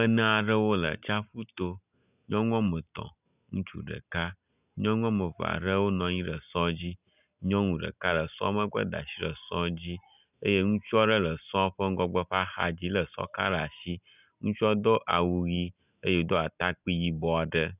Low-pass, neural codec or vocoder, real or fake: 3.6 kHz; none; real